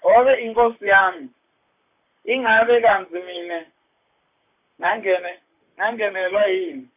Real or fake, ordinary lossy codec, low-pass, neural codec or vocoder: fake; none; 3.6 kHz; vocoder, 44.1 kHz, 128 mel bands, Pupu-Vocoder